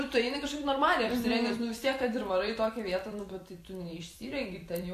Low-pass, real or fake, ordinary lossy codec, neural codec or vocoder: 14.4 kHz; fake; AAC, 64 kbps; vocoder, 44.1 kHz, 128 mel bands every 256 samples, BigVGAN v2